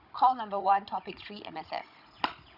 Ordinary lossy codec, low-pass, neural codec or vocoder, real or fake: none; 5.4 kHz; codec, 16 kHz, 16 kbps, FunCodec, trained on Chinese and English, 50 frames a second; fake